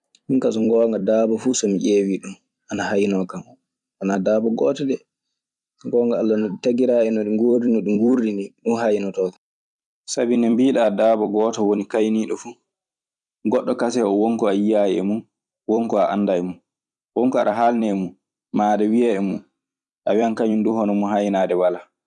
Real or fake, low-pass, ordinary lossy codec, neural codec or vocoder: fake; 10.8 kHz; none; vocoder, 44.1 kHz, 128 mel bands every 256 samples, BigVGAN v2